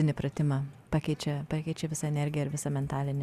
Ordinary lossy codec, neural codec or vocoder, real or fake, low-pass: Opus, 64 kbps; none; real; 14.4 kHz